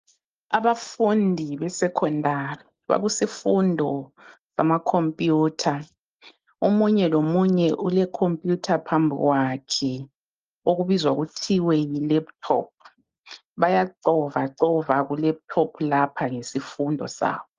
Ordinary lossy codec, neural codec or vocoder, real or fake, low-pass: Opus, 32 kbps; none; real; 7.2 kHz